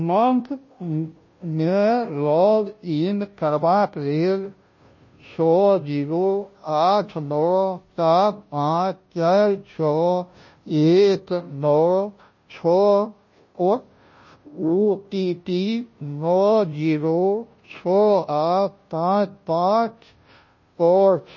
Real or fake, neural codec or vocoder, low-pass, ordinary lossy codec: fake; codec, 16 kHz, 0.5 kbps, FunCodec, trained on Chinese and English, 25 frames a second; 7.2 kHz; MP3, 32 kbps